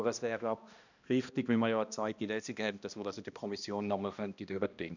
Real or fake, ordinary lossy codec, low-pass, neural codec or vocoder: fake; none; 7.2 kHz; codec, 16 kHz, 1 kbps, X-Codec, HuBERT features, trained on balanced general audio